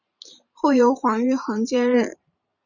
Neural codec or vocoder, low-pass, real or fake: vocoder, 44.1 kHz, 128 mel bands every 512 samples, BigVGAN v2; 7.2 kHz; fake